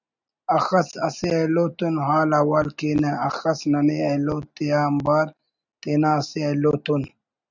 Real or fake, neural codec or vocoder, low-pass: real; none; 7.2 kHz